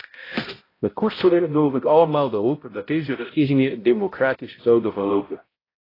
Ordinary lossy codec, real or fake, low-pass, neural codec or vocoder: AAC, 24 kbps; fake; 5.4 kHz; codec, 16 kHz, 0.5 kbps, X-Codec, HuBERT features, trained on balanced general audio